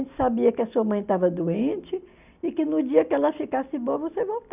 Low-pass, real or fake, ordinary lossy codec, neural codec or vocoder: 3.6 kHz; real; none; none